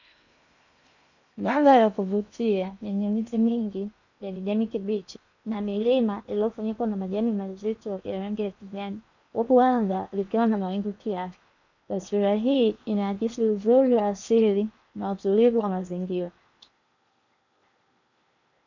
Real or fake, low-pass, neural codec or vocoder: fake; 7.2 kHz; codec, 16 kHz in and 24 kHz out, 0.8 kbps, FocalCodec, streaming, 65536 codes